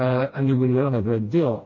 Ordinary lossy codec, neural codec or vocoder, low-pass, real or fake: MP3, 32 kbps; codec, 16 kHz, 1 kbps, FreqCodec, smaller model; 7.2 kHz; fake